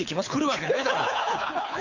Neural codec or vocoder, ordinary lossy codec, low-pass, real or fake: codec, 24 kHz, 6 kbps, HILCodec; AAC, 48 kbps; 7.2 kHz; fake